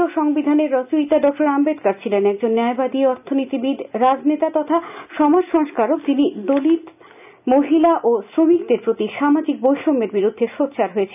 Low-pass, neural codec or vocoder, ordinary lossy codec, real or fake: 3.6 kHz; none; none; real